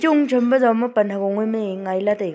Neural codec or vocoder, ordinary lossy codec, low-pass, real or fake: none; none; none; real